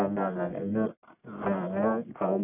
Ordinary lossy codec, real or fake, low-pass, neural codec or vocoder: none; fake; 3.6 kHz; codec, 44.1 kHz, 1.7 kbps, Pupu-Codec